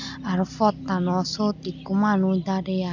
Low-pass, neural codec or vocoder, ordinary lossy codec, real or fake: 7.2 kHz; none; none; real